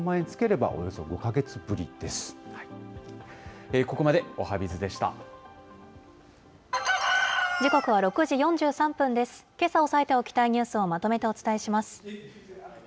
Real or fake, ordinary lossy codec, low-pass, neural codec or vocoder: real; none; none; none